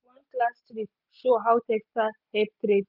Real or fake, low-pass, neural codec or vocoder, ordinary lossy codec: real; 5.4 kHz; none; none